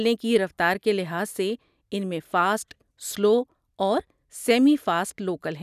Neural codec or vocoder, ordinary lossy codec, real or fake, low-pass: none; none; real; 14.4 kHz